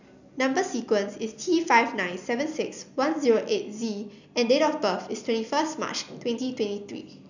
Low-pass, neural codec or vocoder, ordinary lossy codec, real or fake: 7.2 kHz; none; none; real